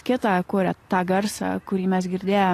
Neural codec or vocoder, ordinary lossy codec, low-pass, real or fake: none; AAC, 48 kbps; 14.4 kHz; real